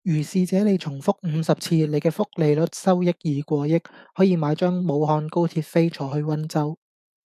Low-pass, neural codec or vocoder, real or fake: 14.4 kHz; autoencoder, 48 kHz, 128 numbers a frame, DAC-VAE, trained on Japanese speech; fake